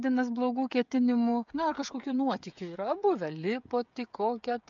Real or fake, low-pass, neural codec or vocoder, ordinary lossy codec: fake; 7.2 kHz; codec, 16 kHz, 16 kbps, FreqCodec, smaller model; MP3, 64 kbps